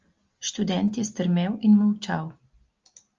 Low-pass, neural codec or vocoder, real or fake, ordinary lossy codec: 7.2 kHz; none; real; Opus, 32 kbps